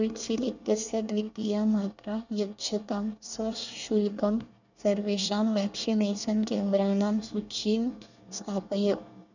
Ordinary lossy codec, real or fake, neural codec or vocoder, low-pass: none; fake; codec, 24 kHz, 1 kbps, SNAC; 7.2 kHz